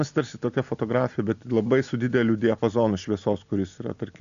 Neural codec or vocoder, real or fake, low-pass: none; real; 7.2 kHz